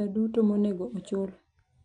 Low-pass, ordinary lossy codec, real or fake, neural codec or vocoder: 9.9 kHz; none; real; none